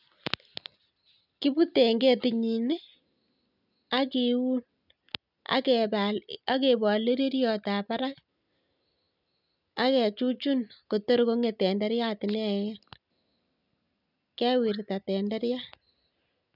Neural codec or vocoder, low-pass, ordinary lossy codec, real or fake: none; 5.4 kHz; none; real